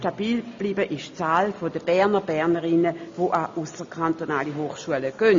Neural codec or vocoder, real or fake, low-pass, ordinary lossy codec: none; real; 7.2 kHz; MP3, 48 kbps